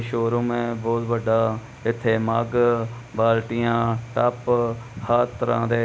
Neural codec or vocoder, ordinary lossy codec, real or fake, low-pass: none; none; real; none